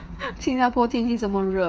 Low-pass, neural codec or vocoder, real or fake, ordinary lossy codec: none; codec, 16 kHz, 8 kbps, FreqCodec, smaller model; fake; none